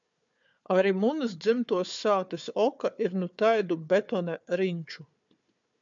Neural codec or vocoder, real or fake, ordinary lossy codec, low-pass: codec, 16 kHz, 4 kbps, FunCodec, trained on Chinese and English, 50 frames a second; fake; MP3, 64 kbps; 7.2 kHz